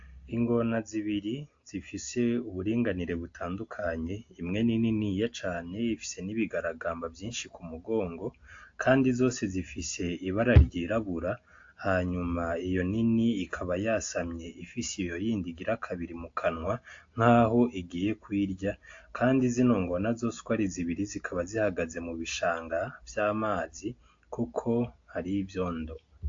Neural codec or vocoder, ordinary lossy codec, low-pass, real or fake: none; AAC, 48 kbps; 7.2 kHz; real